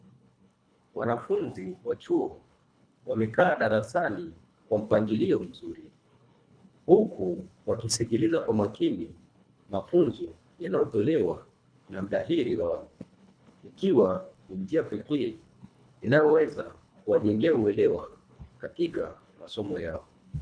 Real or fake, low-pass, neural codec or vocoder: fake; 9.9 kHz; codec, 24 kHz, 1.5 kbps, HILCodec